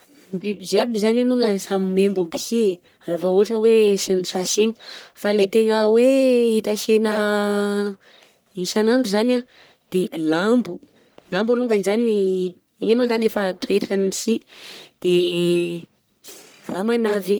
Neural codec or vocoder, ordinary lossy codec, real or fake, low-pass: codec, 44.1 kHz, 1.7 kbps, Pupu-Codec; none; fake; none